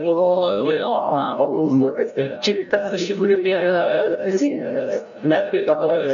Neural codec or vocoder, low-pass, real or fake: codec, 16 kHz, 0.5 kbps, FreqCodec, larger model; 7.2 kHz; fake